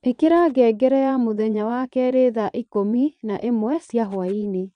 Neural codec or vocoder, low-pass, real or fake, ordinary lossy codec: vocoder, 22.05 kHz, 80 mel bands, Vocos; 9.9 kHz; fake; none